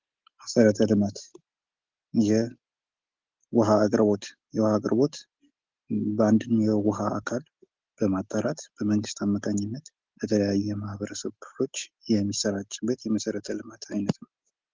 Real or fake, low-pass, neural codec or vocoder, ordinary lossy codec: real; 7.2 kHz; none; Opus, 16 kbps